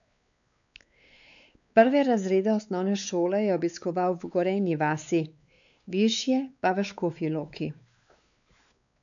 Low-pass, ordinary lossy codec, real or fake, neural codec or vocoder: 7.2 kHz; none; fake; codec, 16 kHz, 4 kbps, X-Codec, WavLM features, trained on Multilingual LibriSpeech